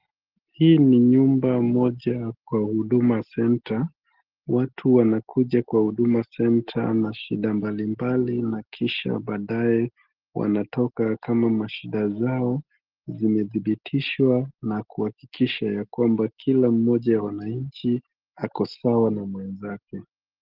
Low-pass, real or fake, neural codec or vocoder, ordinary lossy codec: 5.4 kHz; real; none; Opus, 16 kbps